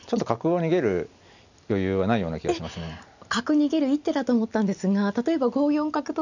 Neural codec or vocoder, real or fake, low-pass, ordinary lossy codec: none; real; 7.2 kHz; none